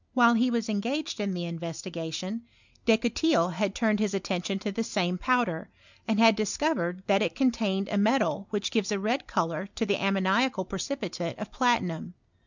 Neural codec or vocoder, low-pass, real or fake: none; 7.2 kHz; real